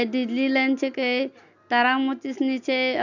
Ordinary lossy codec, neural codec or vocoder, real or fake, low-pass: none; none; real; 7.2 kHz